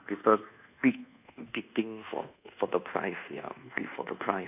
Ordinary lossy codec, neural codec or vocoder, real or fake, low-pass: none; codec, 24 kHz, 1.2 kbps, DualCodec; fake; 3.6 kHz